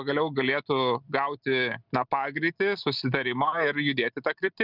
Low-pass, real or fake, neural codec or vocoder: 5.4 kHz; real; none